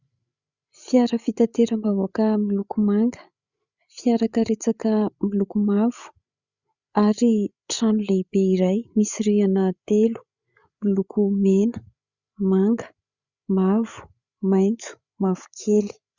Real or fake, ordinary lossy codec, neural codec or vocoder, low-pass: fake; Opus, 64 kbps; codec, 16 kHz, 8 kbps, FreqCodec, larger model; 7.2 kHz